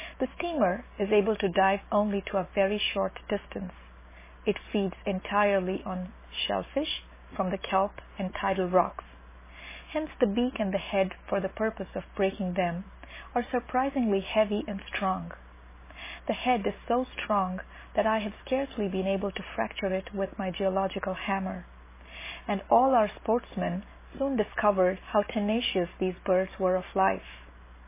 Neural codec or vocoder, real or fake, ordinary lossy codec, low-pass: none; real; MP3, 16 kbps; 3.6 kHz